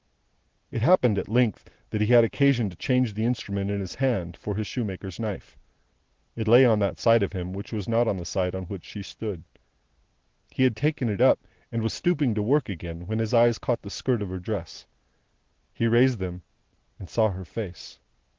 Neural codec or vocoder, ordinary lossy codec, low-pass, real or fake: none; Opus, 16 kbps; 7.2 kHz; real